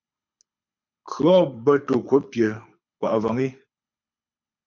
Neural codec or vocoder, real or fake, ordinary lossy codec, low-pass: codec, 24 kHz, 6 kbps, HILCodec; fake; MP3, 64 kbps; 7.2 kHz